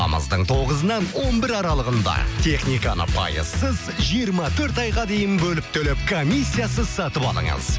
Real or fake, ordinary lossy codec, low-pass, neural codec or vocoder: real; none; none; none